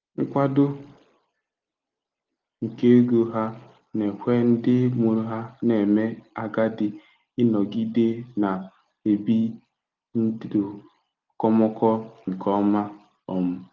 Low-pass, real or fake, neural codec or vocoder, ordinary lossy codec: 7.2 kHz; real; none; Opus, 32 kbps